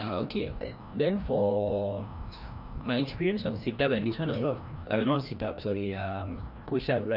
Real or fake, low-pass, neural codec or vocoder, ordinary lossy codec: fake; 5.4 kHz; codec, 16 kHz, 1 kbps, FreqCodec, larger model; none